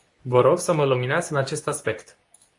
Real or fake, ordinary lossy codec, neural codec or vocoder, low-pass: fake; AAC, 48 kbps; codec, 24 kHz, 0.9 kbps, WavTokenizer, medium speech release version 2; 10.8 kHz